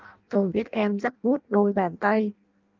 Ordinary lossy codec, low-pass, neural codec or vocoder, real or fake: Opus, 32 kbps; 7.2 kHz; codec, 16 kHz in and 24 kHz out, 0.6 kbps, FireRedTTS-2 codec; fake